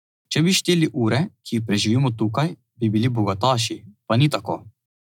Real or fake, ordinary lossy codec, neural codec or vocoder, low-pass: real; none; none; 19.8 kHz